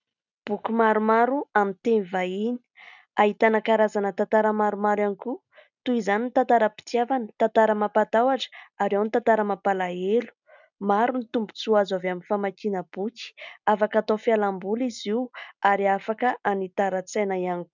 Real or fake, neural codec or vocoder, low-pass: real; none; 7.2 kHz